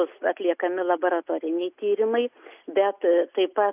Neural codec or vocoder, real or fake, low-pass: none; real; 3.6 kHz